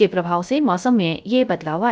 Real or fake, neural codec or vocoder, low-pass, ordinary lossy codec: fake; codec, 16 kHz, 0.3 kbps, FocalCodec; none; none